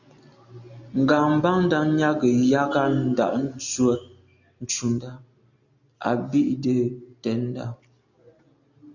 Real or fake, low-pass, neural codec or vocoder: real; 7.2 kHz; none